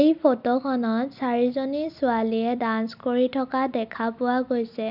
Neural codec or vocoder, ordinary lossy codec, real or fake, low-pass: none; none; real; 5.4 kHz